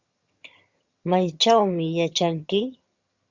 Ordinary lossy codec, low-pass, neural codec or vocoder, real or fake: Opus, 64 kbps; 7.2 kHz; vocoder, 22.05 kHz, 80 mel bands, HiFi-GAN; fake